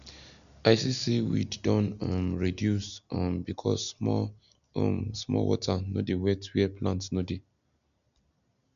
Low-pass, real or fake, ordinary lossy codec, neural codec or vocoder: 7.2 kHz; real; none; none